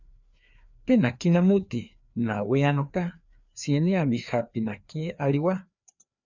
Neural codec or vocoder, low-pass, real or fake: codec, 16 kHz, 2 kbps, FreqCodec, larger model; 7.2 kHz; fake